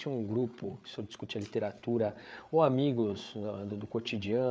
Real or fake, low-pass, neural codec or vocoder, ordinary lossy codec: fake; none; codec, 16 kHz, 8 kbps, FreqCodec, larger model; none